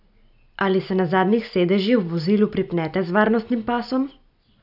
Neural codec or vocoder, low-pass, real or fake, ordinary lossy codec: none; 5.4 kHz; real; none